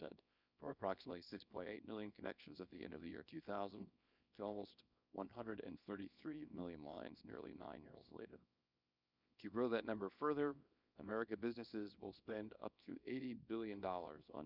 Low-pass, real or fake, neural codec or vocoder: 5.4 kHz; fake; codec, 24 kHz, 0.9 kbps, WavTokenizer, small release